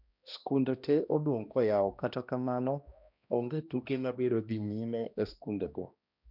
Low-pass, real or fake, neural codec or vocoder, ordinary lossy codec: 5.4 kHz; fake; codec, 16 kHz, 1 kbps, X-Codec, HuBERT features, trained on balanced general audio; none